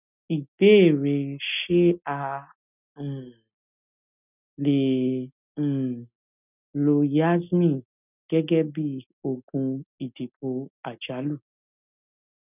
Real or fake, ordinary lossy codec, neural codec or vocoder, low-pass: real; none; none; 3.6 kHz